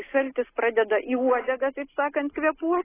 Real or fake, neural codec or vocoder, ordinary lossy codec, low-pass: real; none; AAC, 16 kbps; 3.6 kHz